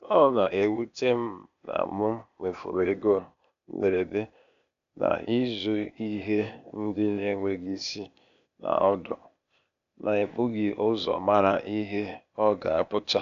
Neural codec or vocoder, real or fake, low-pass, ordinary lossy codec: codec, 16 kHz, 0.8 kbps, ZipCodec; fake; 7.2 kHz; none